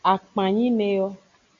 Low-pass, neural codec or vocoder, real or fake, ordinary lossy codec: 7.2 kHz; none; real; MP3, 48 kbps